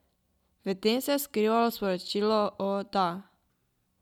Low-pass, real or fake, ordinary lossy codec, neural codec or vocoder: 19.8 kHz; real; none; none